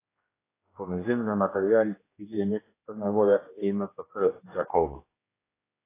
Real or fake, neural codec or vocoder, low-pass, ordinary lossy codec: fake; codec, 16 kHz, 1 kbps, X-Codec, HuBERT features, trained on general audio; 3.6 kHz; AAC, 16 kbps